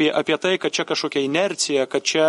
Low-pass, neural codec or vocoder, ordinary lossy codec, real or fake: 19.8 kHz; none; MP3, 48 kbps; real